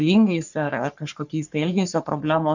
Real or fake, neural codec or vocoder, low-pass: fake; codec, 44.1 kHz, 3.4 kbps, Pupu-Codec; 7.2 kHz